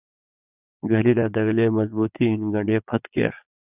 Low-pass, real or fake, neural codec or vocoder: 3.6 kHz; fake; vocoder, 22.05 kHz, 80 mel bands, Vocos